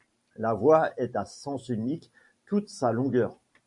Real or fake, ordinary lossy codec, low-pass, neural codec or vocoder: fake; MP3, 64 kbps; 10.8 kHz; vocoder, 44.1 kHz, 128 mel bands every 512 samples, BigVGAN v2